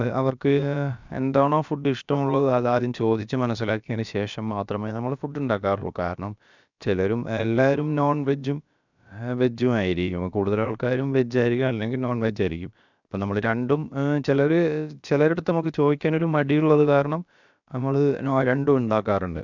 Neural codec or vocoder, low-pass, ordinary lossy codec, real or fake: codec, 16 kHz, about 1 kbps, DyCAST, with the encoder's durations; 7.2 kHz; none; fake